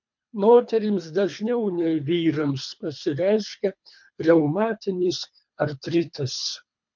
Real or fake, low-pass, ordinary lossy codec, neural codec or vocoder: fake; 7.2 kHz; MP3, 48 kbps; codec, 24 kHz, 3 kbps, HILCodec